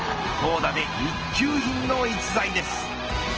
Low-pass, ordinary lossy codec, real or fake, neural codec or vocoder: 7.2 kHz; Opus, 16 kbps; real; none